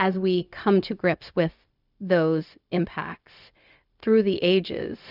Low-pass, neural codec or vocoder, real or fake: 5.4 kHz; codec, 16 kHz, 0.4 kbps, LongCat-Audio-Codec; fake